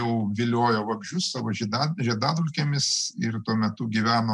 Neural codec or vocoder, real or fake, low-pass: none; real; 10.8 kHz